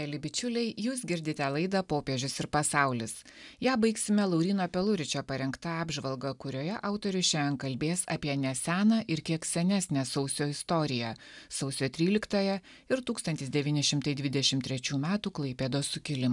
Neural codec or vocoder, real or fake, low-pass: none; real; 10.8 kHz